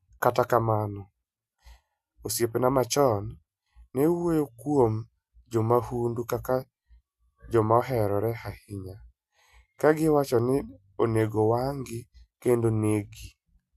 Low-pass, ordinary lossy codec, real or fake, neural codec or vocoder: 14.4 kHz; none; real; none